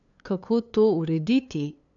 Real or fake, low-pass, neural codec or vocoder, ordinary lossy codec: fake; 7.2 kHz; codec, 16 kHz, 2 kbps, FunCodec, trained on LibriTTS, 25 frames a second; none